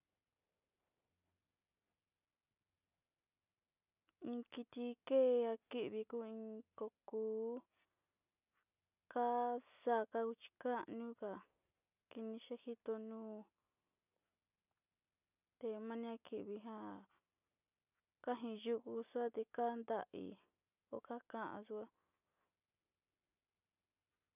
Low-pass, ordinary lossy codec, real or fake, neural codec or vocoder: 3.6 kHz; none; real; none